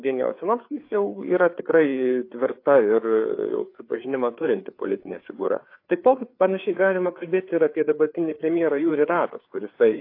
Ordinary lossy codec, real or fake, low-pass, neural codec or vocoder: AAC, 32 kbps; fake; 5.4 kHz; codec, 16 kHz, 4 kbps, FreqCodec, larger model